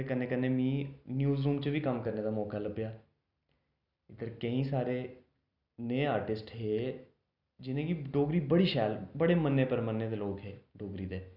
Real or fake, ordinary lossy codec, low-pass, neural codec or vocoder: real; none; 5.4 kHz; none